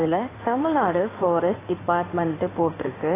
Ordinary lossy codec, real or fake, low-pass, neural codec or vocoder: AAC, 16 kbps; fake; 3.6 kHz; codec, 16 kHz in and 24 kHz out, 2.2 kbps, FireRedTTS-2 codec